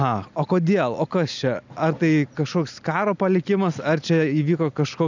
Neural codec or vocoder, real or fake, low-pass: none; real; 7.2 kHz